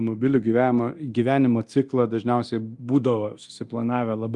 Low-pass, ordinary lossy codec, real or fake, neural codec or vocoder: 10.8 kHz; Opus, 32 kbps; fake; codec, 24 kHz, 0.9 kbps, DualCodec